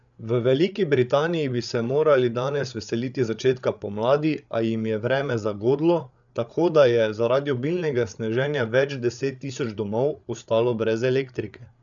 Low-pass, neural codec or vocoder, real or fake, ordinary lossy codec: 7.2 kHz; codec, 16 kHz, 8 kbps, FreqCodec, larger model; fake; none